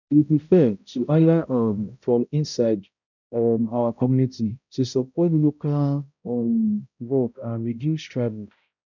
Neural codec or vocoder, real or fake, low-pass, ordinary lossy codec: codec, 16 kHz, 0.5 kbps, X-Codec, HuBERT features, trained on balanced general audio; fake; 7.2 kHz; none